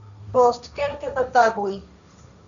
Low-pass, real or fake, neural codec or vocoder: 7.2 kHz; fake; codec, 16 kHz, 1.1 kbps, Voila-Tokenizer